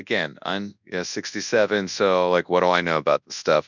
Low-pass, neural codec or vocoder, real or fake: 7.2 kHz; codec, 24 kHz, 0.9 kbps, WavTokenizer, large speech release; fake